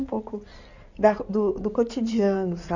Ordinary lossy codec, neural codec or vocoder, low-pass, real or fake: none; codec, 16 kHz in and 24 kHz out, 2.2 kbps, FireRedTTS-2 codec; 7.2 kHz; fake